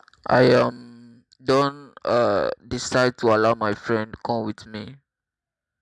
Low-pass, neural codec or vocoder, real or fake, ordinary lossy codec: none; none; real; none